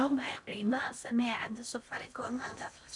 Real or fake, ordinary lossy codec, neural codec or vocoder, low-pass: fake; none; codec, 16 kHz in and 24 kHz out, 0.6 kbps, FocalCodec, streaming, 4096 codes; 10.8 kHz